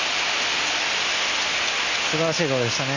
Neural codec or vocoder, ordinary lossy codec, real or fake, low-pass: none; Opus, 64 kbps; real; 7.2 kHz